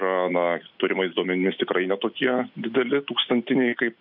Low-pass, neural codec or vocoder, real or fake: 5.4 kHz; none; real